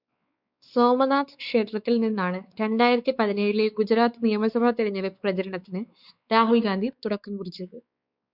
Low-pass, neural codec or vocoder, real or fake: 5.4 kHz; codec, 16 kHz, 4 kbps, X-Codec, WavLM features, trained on Multilingual LibriSpeech; fake